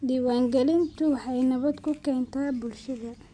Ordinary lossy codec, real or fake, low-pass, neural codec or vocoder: none; real; 9.9 kHz; none